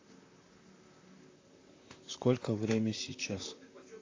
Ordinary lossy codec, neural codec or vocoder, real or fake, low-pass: AAC, 32 kbps; vocoder, 44.1 kHz, 128 mel bands, Pupu-Vocoder; fake; 7.2 kHz